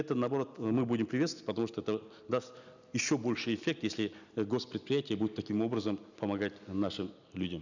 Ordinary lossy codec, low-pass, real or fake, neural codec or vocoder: none; 7.2 kHz; real; none